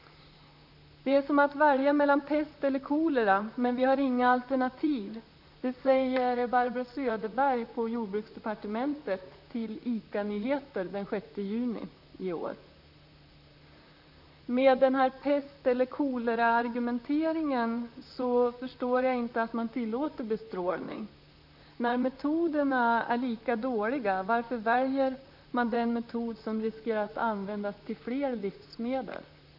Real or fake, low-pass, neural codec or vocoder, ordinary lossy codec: fake; 5.4 kHz; vocoder, 44.1 kHz, 128 mel bands, Pupu-Vocoder; none